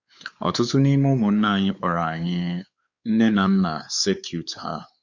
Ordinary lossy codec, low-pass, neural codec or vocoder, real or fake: Opus, 64 kbps; 7.2 kHz; codec, 16 kHz, 4 kbps, X-Codec, WavLM features, trained on Multilingual LibriSpeech; fake